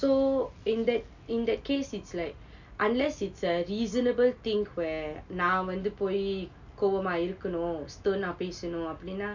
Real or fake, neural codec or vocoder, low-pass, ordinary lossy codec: real; none; 7.2 kHz; none